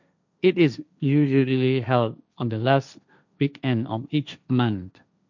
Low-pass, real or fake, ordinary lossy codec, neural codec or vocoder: 7.2 kHz; fake; none; codec, 16 kHz, 1.1 kbps, Voila-Tokenizer